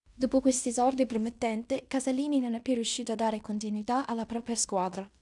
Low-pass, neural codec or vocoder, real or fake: 10.8 kHz; codec, 16 kHz in and 24 kHz out, 0.9 kbps, LongCat-Audio-Codec, fine tuned four codebook decoder; fake